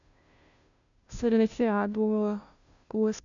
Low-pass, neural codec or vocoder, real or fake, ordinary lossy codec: 7.2 kHz; codec, 16 kHz, 0.5 kbps, FunCodec, trained on Chinese and English, 25 frames a second; fake; none